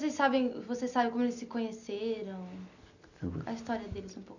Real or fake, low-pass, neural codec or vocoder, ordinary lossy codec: real; 7.2 kHz; none; none